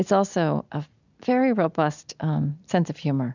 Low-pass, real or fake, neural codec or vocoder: 7.2 kHz; real; none